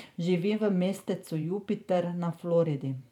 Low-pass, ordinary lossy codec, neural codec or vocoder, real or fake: 19.8 kHz; none; vocoder, 44.1 kHz, 128 mel bands every 512 samples, BigVGAN v2; fake